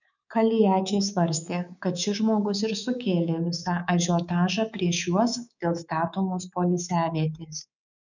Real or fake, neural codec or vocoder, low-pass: fake; codec, 24 kHz, 3.1 kbps, DualCodec; 7.2 kHz